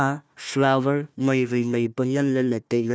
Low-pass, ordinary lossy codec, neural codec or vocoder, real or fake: none; none; codec, 16 kHz, 1 kbps, FunCodec, trained on Chinese and English, 50 frames a second; fake